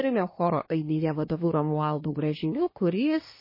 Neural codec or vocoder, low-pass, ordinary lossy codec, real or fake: codec, 24 kHz, 1 kbps, SNAC; 5.4 kHz; MP3, 24 kbps; fake